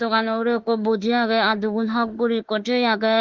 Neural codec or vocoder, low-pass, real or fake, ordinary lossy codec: autoencoder, 48 kHz, 32 numbers a frame, DAC-VAE, trained on Japanese speech; 7.2 kHz; fake; Opus, 16 kbps